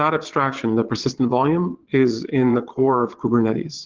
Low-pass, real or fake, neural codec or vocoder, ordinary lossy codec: 7.2 kHz; fake; vocoder, 22.05 kHz, 80 mel bands, Vocos; Opus, 16 kbps